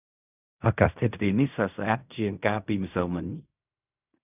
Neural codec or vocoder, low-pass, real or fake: codec, 16 kHz in and 24 kHz out, 0.4 kbps, LongCat-Audio-Codec, fine tuned four codebook decoder; 3.6 kHz; fake